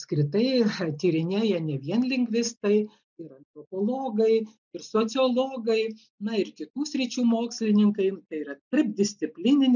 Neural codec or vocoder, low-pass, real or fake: none; 7.2 kHz; real